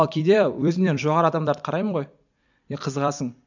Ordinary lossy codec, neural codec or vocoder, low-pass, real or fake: none; vocoder, 44.1 kHz, 128 mel bands every 256 samples, BigVGAN v2; 7.2 kHz; fake